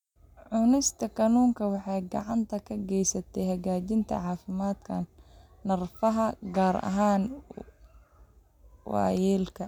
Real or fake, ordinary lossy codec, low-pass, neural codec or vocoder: real; none; 19.8 kHz; none